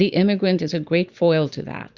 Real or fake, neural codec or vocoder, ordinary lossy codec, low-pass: fake; vocoder, 44.1 kHz, 128 mel bands every 256 samples, BigVGAN v2; Opus, 64 kbps; 7.2 kHz